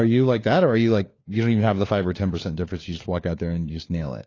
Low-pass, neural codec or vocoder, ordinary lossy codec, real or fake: 7.2 kHz; codec, 16 kHz, 4 kbps, FunCodec, trained on LibriTTS, 50 frames a second; AAC, 32 kbps; fake